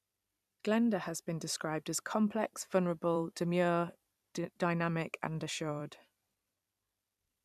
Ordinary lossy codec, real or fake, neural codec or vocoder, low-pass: none; fake; vocoder, 44.1 kHz, 128 mel bands every 256 samples, BigVGAN v2; 14.4 kHz